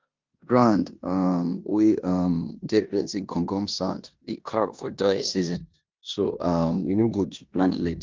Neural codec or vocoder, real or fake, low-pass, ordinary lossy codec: codec, 16 kHz in and 24 kHz out, 0.9 kbps, LongCat-Audio-Codec, four codebook decoder; fake; 7.2 kHz; Opus, 24 kbps